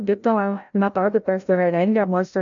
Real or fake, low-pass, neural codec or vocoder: fake; 7.2 kHz; codec, 16 kHz, 0.5 kbps, FreqCodec, larger model